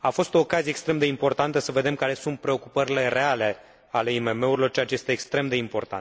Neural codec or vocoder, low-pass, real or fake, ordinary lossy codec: none; none; real; none